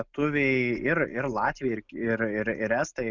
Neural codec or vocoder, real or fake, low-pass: none; real; 7.2 kHz